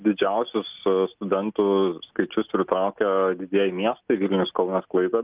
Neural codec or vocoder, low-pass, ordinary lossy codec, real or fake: none; 3.6 kHz; Opus, 24 kbps; real